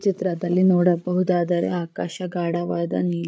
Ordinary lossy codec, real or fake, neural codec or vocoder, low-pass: none; fake; codec, 16 kHz, 8 kbps, FreqCodec, larger model; none